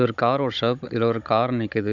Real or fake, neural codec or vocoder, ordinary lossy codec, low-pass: fake; codec, 16 kHz, 16 kbps, FunCodec, trained on Chinese and English, 50 frames a second; none; 7.2 kHz